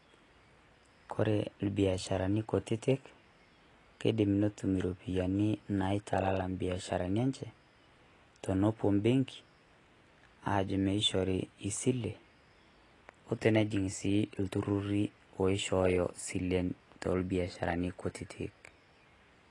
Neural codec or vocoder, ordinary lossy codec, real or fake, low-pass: none; AAC, 32 kbps; real; 10.8 kHz